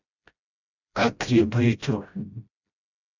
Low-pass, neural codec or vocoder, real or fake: 7.2 kHz; codec, 16 kHz, 0.5 kbps, FreqCodec, smaller model; fake